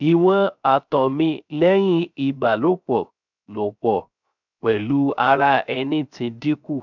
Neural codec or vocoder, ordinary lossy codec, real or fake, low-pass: codec, 16 kHz, 0.3 kbps, FocalCodec; none; fake; 7.2 kHz